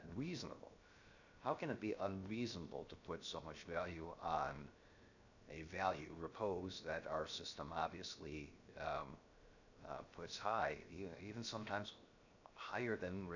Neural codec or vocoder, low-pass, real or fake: codec, 16 kHz, 0.7 kbps, FocalCodec; 7.2 kHz; fake